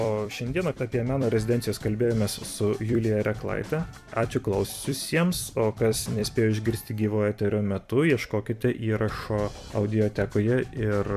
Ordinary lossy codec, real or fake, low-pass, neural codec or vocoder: MP3, 96 kbps; fake; 14.4 kHz; vocoder, 44.1 kHz, 128 mel bands every 256 samples, BigVGAN v2